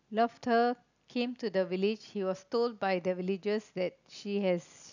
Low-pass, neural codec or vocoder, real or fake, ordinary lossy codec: 7.2 kHz; none; real; none